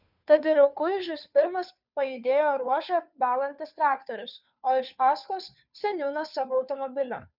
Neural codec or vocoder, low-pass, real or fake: codec, 16 kHz, 2 kbps, FunCodec, trained on Chinese and English, 25 frames a second; 5.4 kHz; fake